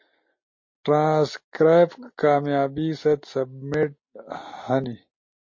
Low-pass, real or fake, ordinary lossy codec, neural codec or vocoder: 7.2 kHz; real; MP3, 32 kbps; none